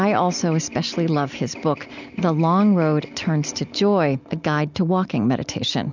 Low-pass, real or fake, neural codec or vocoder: 7.2 kHz; real; none